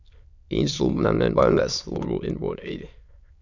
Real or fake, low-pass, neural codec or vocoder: fake; 7.2 kHz; autoencoder, 22.05 kHz, a latent of 192 numbers a frame, VITS, trained on many speakers